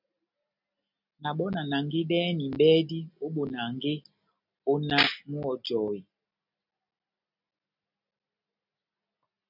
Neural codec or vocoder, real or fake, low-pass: none; real; 5.4 kHz